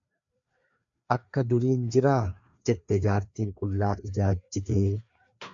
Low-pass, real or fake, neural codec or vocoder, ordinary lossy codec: 7.2 kHz; fake; codec, 16 kHz, 2 kbps, FreqCodec, larger model; AAC, 64 kbps